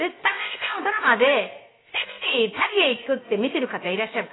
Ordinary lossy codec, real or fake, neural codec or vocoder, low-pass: AAC, 16 kbps; fake; codec, 16 kHz, about 1 kbps, DyCAST, with the encoder's durations; 7.2 kHz